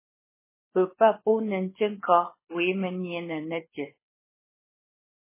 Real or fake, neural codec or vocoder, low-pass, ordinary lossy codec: fake; codec, 24 kHz, 6 kbps, HILCodec; 3.6 kHz; MP3, 16 kbps